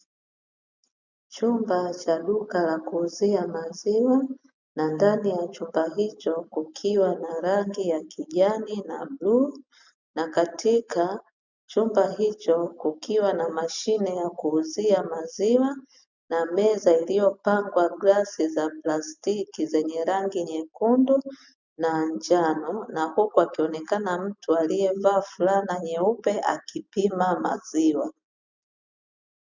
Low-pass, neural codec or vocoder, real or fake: 7.2 kHz; none; real